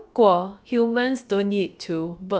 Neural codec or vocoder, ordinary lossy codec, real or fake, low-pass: codec, 16 kHz, about 1 kbps, DyCAST, with the encoder's durations; none; fake; none